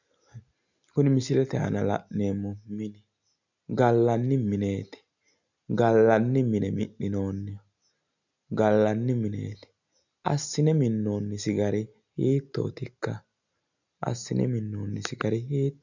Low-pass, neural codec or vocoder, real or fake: 7.2 kHz; none; real